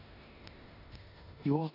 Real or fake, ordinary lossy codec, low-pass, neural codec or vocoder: fake; none; 5.4 kHz; codec, 16 kHz in and 24 kHz out, 0.9 kbps, LongCat-Audio-Codec, four codebook decoder